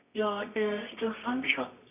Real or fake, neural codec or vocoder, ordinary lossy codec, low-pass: fake; codec, 24 kHz, 0.9 kbps, WavTokenizer, medium music audio release; none; 3.6 kHz